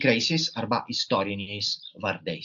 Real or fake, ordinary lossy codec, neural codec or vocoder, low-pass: real; AAC, 64 kbps; none; 7.2 kHz